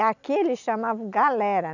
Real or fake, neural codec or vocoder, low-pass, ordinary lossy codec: real; none; 7.2 kHz; none